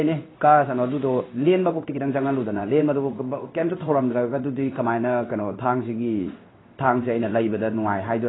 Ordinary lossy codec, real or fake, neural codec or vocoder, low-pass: AAC, 16 kbps; fake; codec, 16 kHz in and 24 kHz out, 1 kbps, XY-Tokenizer; 7.2 kHz